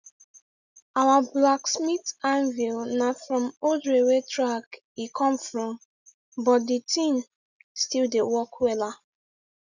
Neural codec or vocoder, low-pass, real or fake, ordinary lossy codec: none; 7.2 kHz; real; none